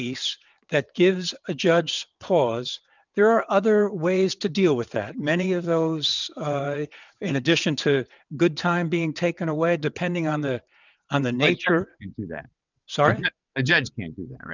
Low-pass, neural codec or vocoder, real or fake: 7.2 kHz; vocoder, 22.05 kHz, 80 mel bands, WaveNeXt; fake